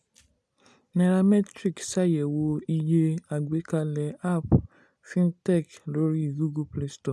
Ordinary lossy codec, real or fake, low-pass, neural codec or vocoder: none; real; none; none